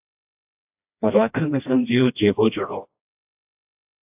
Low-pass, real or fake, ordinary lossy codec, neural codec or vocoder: 3.6 kHz; fake; AAC, 32 kbps; codec, 16 kHz, 1 kbps, FreqCodec, smaller model